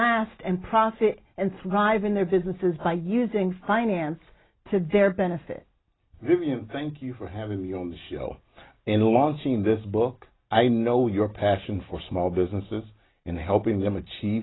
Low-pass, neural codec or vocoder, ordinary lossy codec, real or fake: 7.2 kHz; none; AAC, 16 kbps; real